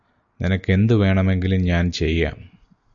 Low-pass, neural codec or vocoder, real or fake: 7.2 kHz; none; real